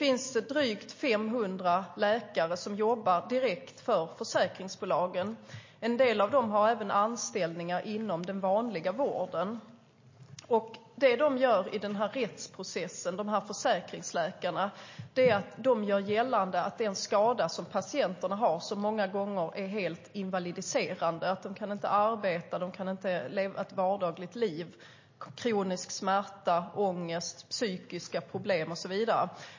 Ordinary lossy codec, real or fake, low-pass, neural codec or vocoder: MP3, 32 kbps; real; 7.2 kHz; none